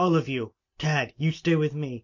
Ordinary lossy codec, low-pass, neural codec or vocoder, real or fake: MP3, 48 kbps; 7.2 kHz; none; real